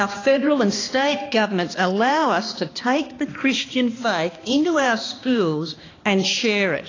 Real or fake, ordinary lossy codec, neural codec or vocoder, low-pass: fake; AAC, 32 kbps; codec, 16 kHz, 2 kbps, X-Codec, HuBERT features, trained on balanced general audio; 7.2 kHz